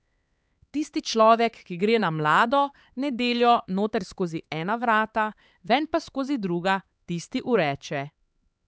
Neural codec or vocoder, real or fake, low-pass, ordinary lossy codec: codec, 16 kHz, 4 kbps, X-Codec, HuBERT features, trained on LibriSpeech; fake; none; none